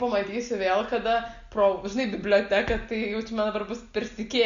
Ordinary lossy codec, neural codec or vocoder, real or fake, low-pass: Opus, 64 kbps; none; real; 7.2 kHz